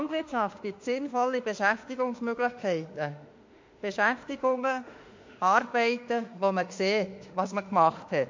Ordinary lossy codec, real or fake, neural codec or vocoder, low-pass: MP3, 48 kbps; fake; autoencoder, 48 kHz, 32 numbers a frame, DAC-VAE, trained on Japanese speech; 7.2 kHz